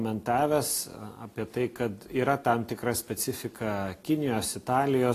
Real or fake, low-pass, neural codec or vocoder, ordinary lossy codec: real; 14.4 kHz; none; AAC, 48 kbps